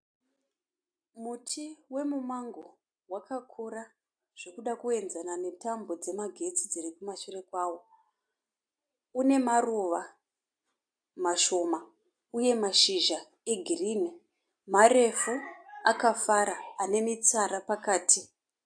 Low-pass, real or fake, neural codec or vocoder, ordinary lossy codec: 9.9 kHz; real; none; AAC, 64 kbps